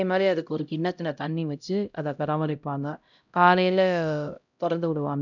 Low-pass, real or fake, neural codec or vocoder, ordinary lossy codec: 7.2 kHz; fake; codec, 16 kHz, 0.5 kbps, X-Codec, HuBERT features, trained on LibriSpeech; none